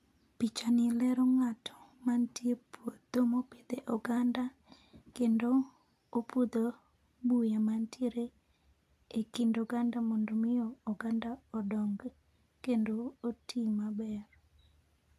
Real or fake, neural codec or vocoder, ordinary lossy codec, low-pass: real; none; none; 14.4 kHz